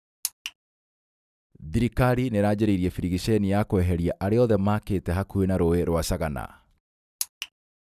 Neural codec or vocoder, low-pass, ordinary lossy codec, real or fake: none; 14.4 kHz; none; real